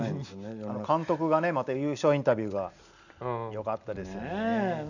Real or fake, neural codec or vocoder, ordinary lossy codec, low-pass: real; none; none; 7.2 kHz